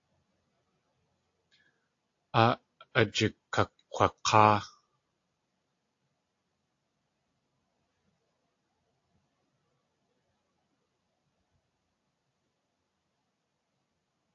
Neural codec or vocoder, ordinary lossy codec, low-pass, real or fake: none; AAC, 48 kbps; 7.2 kHz; real